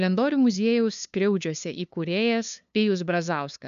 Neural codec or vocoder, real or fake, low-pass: codec, 16 kHz, 2 kbps, FunCodec, trained on LibriTTS, 25 frames a second; fake; 7.2 kHz